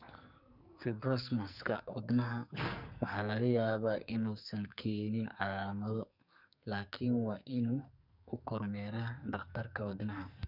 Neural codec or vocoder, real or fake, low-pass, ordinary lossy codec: codec, 44.1 kHz, 2.6 kbps, SNAC; fake; 5.4 kHz; none